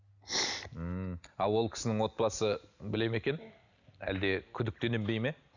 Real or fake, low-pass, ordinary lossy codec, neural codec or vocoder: real; 7.2 kHz; none; none